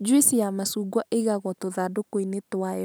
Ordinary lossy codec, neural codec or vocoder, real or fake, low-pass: none; none; real; none